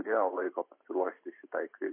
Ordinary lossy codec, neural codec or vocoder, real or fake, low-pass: MP3, 24 kbps; vocoder, 44.1 kHz, 128 mel bands every 512 samples, BigVGAN v2; fake; 3.6 kHz